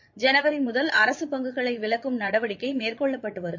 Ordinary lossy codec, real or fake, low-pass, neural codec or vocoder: MP3, 48 kbps; fake; 7.2 kHz; vocoder, 22.05 kHz, 80 mel bands, Vocos